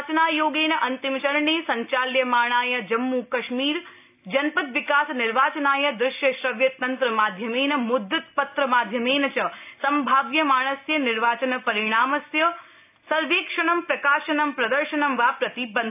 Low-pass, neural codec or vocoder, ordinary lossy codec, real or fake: 3.6 kHz; none; AAC, 32 kbps; real